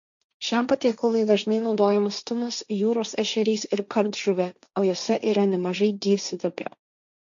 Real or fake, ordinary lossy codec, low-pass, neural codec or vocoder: fake; MP3, 64 kbps; 7.2 kHz; codec, 16 kHz, 1.1 kbps, Voila-Tokenizer